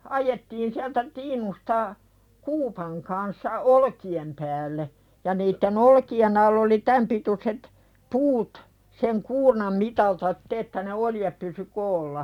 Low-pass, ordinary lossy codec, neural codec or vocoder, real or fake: 19.8 kHz; none; vocoder, 44.1 kHz, 128 mel bands every 256 samples, BigVGAN v2; fake